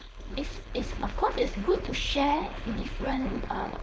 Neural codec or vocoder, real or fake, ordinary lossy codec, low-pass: codec, 16 kHz, 4.8 kbps, FACodec; fake; none; none